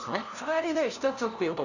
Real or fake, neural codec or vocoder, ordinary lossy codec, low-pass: fake; codec, 16 kHz, 0.5 kbps, FunCodec, trained on LibriTTS, 25 frames a second; none; 7.2 kHz